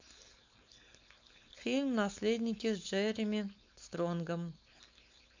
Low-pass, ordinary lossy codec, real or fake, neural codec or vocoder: 7.2 kHz; MP3, 64 kbps; fake; codec, 16 kHz, 4.8 kbps, FACodec